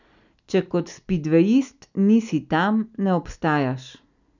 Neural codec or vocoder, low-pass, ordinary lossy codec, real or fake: none; 7.2 kHz; none; real